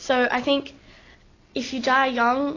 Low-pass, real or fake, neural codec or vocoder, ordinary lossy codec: 7.2 kHz; real; none; AAC, 32 kbps